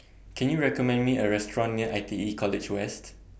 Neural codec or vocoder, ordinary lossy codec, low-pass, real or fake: none; none; none; real